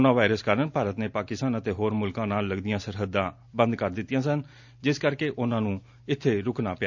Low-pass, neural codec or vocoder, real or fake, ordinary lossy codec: 7.2 kHz; none; real; none